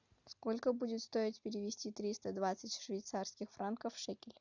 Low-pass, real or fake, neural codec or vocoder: 7.2 kHz; real; none